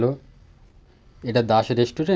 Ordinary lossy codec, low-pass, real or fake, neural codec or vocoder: none; none; real; none